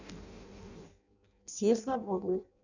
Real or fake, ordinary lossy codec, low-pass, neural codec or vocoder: fake; none; 7.2 kHz; codec, 16 kHz in and 24 kHz out, 0.6 kbps, FireRedTTS-2 codec